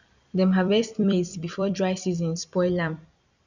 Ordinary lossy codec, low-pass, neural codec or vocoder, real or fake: none; 7.2 kHz; vocoder, 44.1 kHz, 128 mel bands every 256 samples, BigVGAN v2; fake